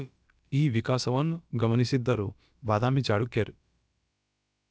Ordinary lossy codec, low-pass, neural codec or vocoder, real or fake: none; none; codec, 16 kHz, about 1 kbps, DyCAST, with the encoder's durations; fake